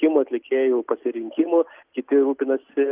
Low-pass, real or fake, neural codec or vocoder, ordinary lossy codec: 3.6 kHz; real; none; Opus, 32 kbps